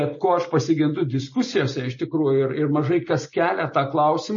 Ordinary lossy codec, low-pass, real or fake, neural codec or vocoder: MP3, 32 kbps; 7.2 kHz; real; none